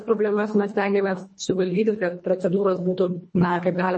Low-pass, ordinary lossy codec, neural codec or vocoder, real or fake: 9.9 kHz; MP3, 32 kbps; codec, 24 kHz, 1.5 kbps, HILCodec; fake